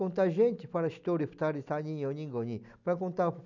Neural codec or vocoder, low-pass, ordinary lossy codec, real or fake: none; 7.2 kHz; none; real